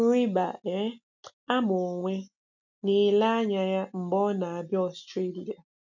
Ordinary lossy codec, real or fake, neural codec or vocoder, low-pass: none; real; none; 7.2 kHz